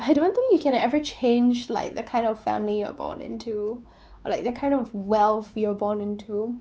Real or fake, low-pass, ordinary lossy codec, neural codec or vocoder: fake; none; none; codec, 16 kHz, 4 kbps, X-Codec, WavLM features, trained on Multilingual LibriSpeech